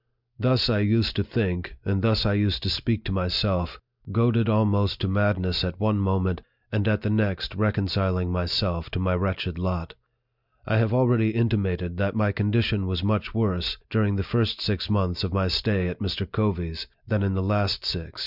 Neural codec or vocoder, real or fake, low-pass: none; real; 5.4 kHz